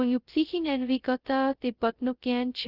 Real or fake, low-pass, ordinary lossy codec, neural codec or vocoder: fake; 5.4 kHz; Opus, 32 kbps; codec, 16 kHz, 0.2 kbps, FocalCodec